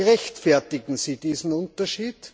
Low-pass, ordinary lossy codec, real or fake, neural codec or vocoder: none; none; real; none